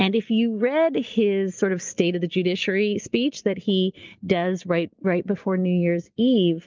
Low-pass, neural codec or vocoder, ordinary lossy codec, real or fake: 7.2 kHz; none; Opus, 24 kbps; real